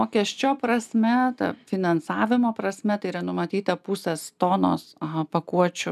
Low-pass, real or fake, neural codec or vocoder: 14.4 kHz; real; none